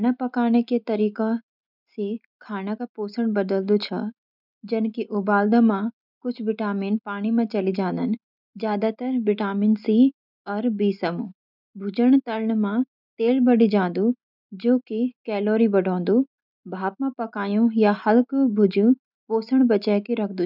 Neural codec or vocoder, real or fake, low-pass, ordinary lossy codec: none; real; 5.4 kHz; none